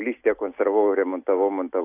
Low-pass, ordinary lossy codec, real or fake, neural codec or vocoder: 3.6 kHz; AAC, 32 kbps; real; none